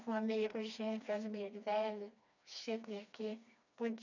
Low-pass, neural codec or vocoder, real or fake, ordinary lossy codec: 7.2 kHz; codec, 16 kHz, 2 kbps, FreqCodec, smaller model; fake; none